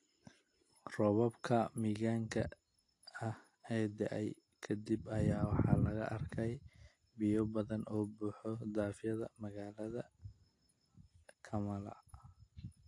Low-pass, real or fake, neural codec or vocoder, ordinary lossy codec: 10.8 kHz; real; none; AAC, 48 kbps